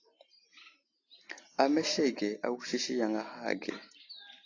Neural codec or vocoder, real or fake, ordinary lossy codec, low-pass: none; real; AAC, 32 kbps; 7.2 kHz